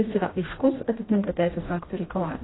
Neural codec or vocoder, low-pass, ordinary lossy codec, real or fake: codec, 16 kHz, 1 kbps, FreqCodec, smaller model; 7.2 kHz; AAC, 16 kbps; fake